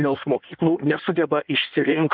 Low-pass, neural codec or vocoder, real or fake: 5.4 kHz; codec, 16 kHz in and 24 kHz out, 1.1 kbps, FireRedTTS-2 codec; fake